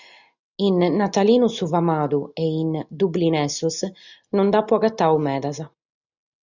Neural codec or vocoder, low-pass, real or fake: none; 7.2 kHz; real